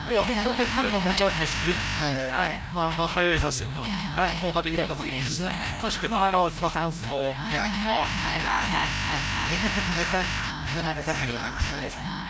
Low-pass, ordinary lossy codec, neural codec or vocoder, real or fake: none; none; codec, 16 kHz, 0.5 kbps, FreqCodec, larger model; fake